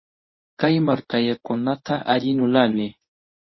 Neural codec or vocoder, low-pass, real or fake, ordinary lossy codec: codec, 24 kHz, 0.9 kbps, WavTokenizer, medium speech release version 1; 7.2 kHz; fake; MP3, 24 kbps